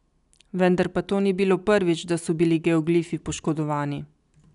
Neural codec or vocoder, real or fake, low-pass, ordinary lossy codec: none; real; 10.8 kHz; none